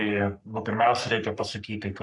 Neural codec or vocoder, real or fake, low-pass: codec, 44.1 kHz, 3.4 kbps, Pupu-Codec; fake; 10.8 kHz